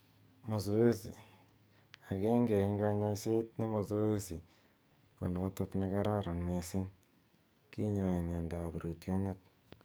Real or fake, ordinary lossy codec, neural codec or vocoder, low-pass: fake; none; codec, 44.1 kHz, 2.6 kbps, SNAC; none